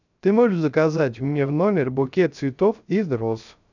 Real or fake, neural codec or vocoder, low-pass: fake; codec, 16 kHz, 0.3 kbps, FocalCodec; 7.2 kHz